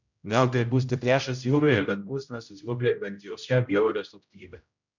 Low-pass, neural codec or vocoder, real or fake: 7.2 kHz; codec, 16 kHz, 0.5 kbps, X-Codec, HuBERT features, trained on general audio; fake